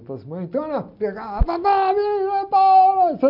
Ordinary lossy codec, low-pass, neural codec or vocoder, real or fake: none; 5.4 kHz; none; real